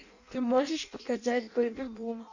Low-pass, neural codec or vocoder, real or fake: 7.2 kHz; codec, 16 kHz in and 24 kHz out, 0.6 kbps, FireRedTTS-2 codec; fake